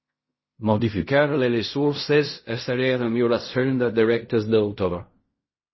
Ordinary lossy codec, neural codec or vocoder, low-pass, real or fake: MP3, 24 kbps; codec, 16 kHz in and 24 kHz out, 0.4 kbps, LongCat-Audio-Codec, fine tuned four codebook decoder; 7.2 kHz; fake